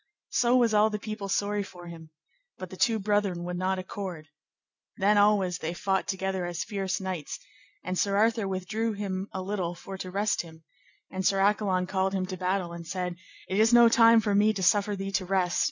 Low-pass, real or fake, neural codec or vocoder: 7.2 kHz; real; none